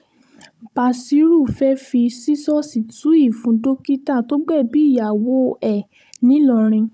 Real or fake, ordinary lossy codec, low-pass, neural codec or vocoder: fake; none; none; codec, 16 kHz, 16 kbps, FunCodec, trained on Chinese and English, 50 frames a second